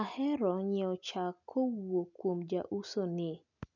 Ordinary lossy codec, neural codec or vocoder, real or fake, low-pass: none; none; real; 7.2 kHz